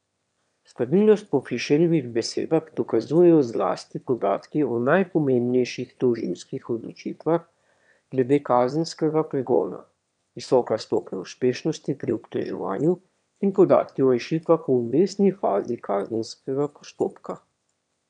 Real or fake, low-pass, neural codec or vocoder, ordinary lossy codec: fake; 9.9 kHz; autoencoder, 22.05 kHz, a latent of 192 numbers a frame, VITS, trained on one speaker; none